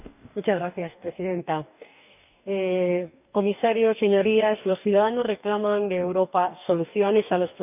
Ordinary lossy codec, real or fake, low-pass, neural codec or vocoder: none; fake; 3.6 kHz; codec, 44.1 kHz, 2.6 kbps, DAC